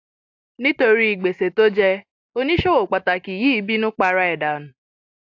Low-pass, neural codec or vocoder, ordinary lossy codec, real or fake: 7.2 kHz; none; AAC, 48 kbps; real